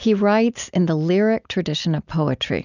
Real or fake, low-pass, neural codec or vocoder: real; 7.2 kHz; none